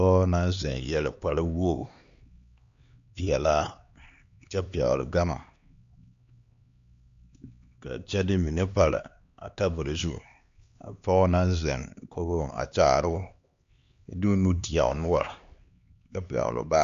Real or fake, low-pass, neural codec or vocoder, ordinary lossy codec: fake; 7.2 kHz; codec, 16 kHz, 2 kbps, X-Codec, HuBERT features, trained on LibriSpeech; Opus, 64 kbps